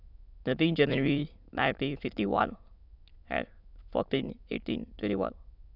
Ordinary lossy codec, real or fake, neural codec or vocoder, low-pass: none; fake; autoencoder, 22.05 kHz, a latent of 192 numbers a frame, VITS, trained on many speakers; 5.4 kHz